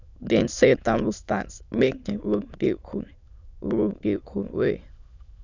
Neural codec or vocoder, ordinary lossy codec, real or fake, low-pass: autoencoder, 22.05 kHz, a latent of 192 numbers a frame, VITS, trained on many speakers; none; fake; 7.2 kHz